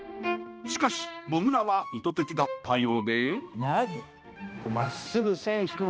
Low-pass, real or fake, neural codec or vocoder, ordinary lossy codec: none; fake; codec, 16 kHz, 1 kbps, X-Codec, HuBERT features, trained on balanced general audio; none